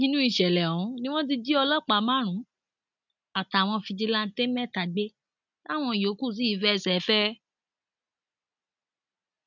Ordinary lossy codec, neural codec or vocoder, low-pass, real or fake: none; none; 7.2 kHz; real